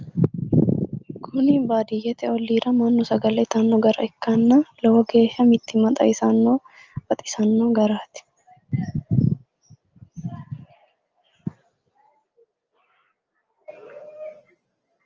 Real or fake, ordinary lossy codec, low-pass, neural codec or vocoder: real; Opus, 24 kbps; 7.2 kHz; none